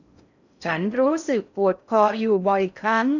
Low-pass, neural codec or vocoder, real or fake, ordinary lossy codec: 7.2 kHz; codec, 16 kHz in and 24 kHz out, 0.6 kbps, FocalCodec, streaming, 4096 codes; fake; none